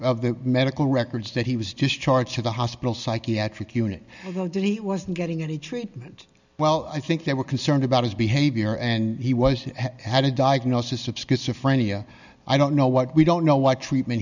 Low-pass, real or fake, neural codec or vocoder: 7.2 kHz; real; none